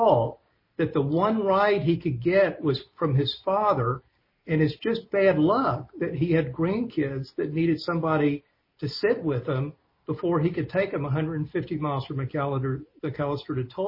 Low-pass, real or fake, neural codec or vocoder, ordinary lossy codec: 5.4 kHz; real; none; MP3, 24 kbps